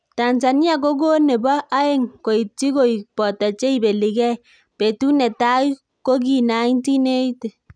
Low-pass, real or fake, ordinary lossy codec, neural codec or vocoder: 9.9 kHz; real; none; none